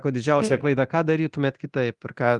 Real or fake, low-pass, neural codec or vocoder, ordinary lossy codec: fake; 10.8 kHz; codec, 24 kHz, 0.9 kbps, DualCodec; Opus, 32 kbps